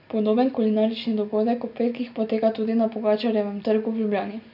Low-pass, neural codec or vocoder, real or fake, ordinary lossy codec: 5.4 kHz; none; real; none